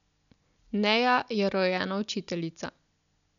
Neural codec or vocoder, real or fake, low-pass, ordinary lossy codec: none; real; 7.2 kHz; none